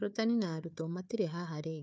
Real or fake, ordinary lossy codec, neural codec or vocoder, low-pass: fake; none; codec, 16 kHz, 4 kbps, FunCodec, trained on Chinese and English, 50 frames a second; none